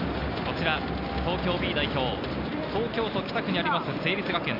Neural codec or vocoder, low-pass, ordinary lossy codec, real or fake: none; 5.4 kHz; none; real